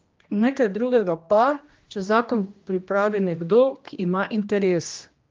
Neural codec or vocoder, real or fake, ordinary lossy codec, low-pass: codec, 16 kHz, 1 kbps, X-Codec, HuBERT features, trained on general audio; fake; Opus, 32 kbps; 7.2 kHz